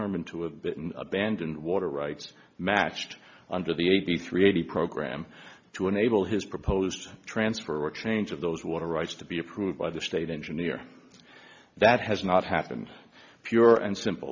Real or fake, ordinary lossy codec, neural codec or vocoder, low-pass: real; MP3, 64 kbps; none; 7.2 kHz